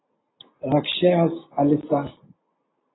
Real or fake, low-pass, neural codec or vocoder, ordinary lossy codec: real; 7.2 kHz; none; AAC, 16 kbps